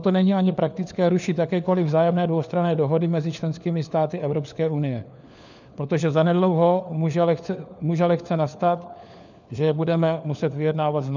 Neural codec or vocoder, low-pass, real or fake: codec, 16 kHz, 4 kbps, FunCodec, trained on LibriTTS, 50 frames a second; 7.2 kHz; fake